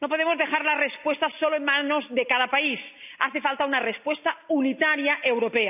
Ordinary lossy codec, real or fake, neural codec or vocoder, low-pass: none; real; none; 3.6 kHz